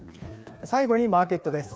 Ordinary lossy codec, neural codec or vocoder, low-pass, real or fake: none; codec, 16 kHz, 2 kbps, FreqCodec, larger model; none; fake